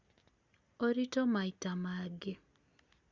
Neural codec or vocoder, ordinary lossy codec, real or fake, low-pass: none; Opus, 64 kbps; real; 7.2 kHz